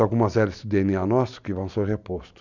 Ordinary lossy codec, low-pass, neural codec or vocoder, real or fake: none; 7.2 kHz; none; real